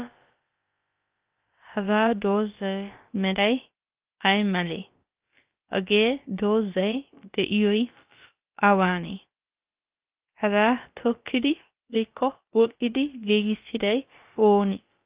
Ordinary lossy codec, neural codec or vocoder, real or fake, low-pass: Opus, 32 kbps; codec, 16 kHz, about 1 kbps, DyCAST, with the encoder's durations; fake; 3.6 kHz